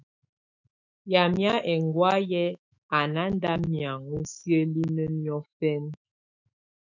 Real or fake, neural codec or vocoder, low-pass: fake; autoencoder, 48 kHz, 128 numbers a frame, DAC-VAE, trained on Japanese speech; 7.2 kHz